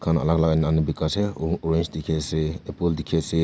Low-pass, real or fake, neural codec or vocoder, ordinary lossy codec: none; real; none; none